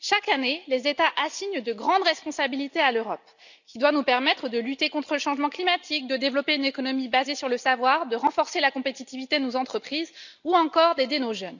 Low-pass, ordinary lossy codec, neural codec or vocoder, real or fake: 7.2 kHz; none; none; real